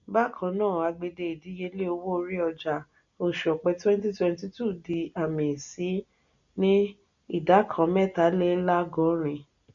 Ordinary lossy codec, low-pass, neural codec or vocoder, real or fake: none; 7.2 kHz; none; real